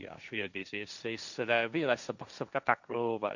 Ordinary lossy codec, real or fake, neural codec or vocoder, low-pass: none; fake; codec, 16 kHz, 1.1 kbps, Voila-Tokenizer; 7.2 kHz